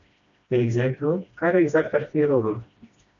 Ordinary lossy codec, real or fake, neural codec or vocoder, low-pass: AAC, 64 kbps; fake; codec, 16 kHz, 1 kbps, FreqCodec, smaller model; 7.2 kHz